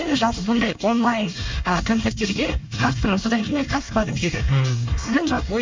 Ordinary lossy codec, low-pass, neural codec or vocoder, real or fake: MP3, 48 kbps; 7.2 kHz; codec, 24 kHz, 1 kbps, SNAC; fake